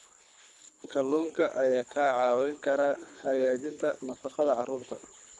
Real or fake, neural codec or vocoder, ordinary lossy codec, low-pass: fake; codec, 24 kHz, 3 kbps, HILCodec; none; none